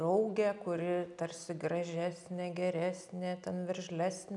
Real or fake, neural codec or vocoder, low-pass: real; none; 10.8 kHz